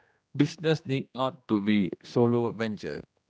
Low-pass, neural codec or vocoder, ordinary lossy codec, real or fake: none; codec, 16 kHz, 1 kbps, X-Codec, HuBERT features, trained on general audio; none; fake